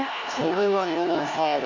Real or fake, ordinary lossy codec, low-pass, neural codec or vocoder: fake; none; 7.2 kHz; codec, 16 kHz, 1 kbps, FunCodec, trained on LibriTTS, 50 frames a second